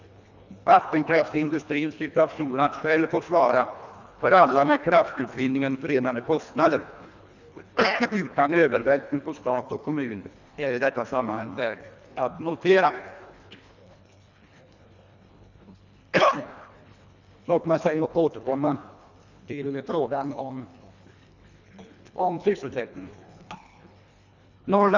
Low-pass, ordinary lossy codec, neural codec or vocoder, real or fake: 7.2 kHz; none; codec, 24 kHz, 1.5 kbps, HILCodec; fake